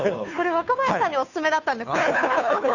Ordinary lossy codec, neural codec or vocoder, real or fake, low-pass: none; codec, 16 kHz, 2 kbps, FunCodec, trained on Chinese and English, 25 frames a second; fake; 7.2 kHz